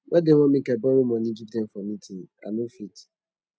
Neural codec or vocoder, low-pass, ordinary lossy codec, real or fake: none; none; none; real